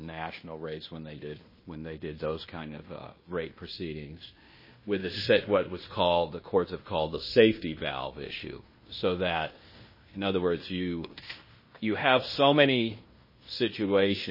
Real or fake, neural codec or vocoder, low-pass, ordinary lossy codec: fake; codec, 16 kHz in and 24 kHz out, 0.9 kbps, LongCat-Audio-Codec, fine tuned four codebook decoder; 5.4 kHz; MP3, 24 kbps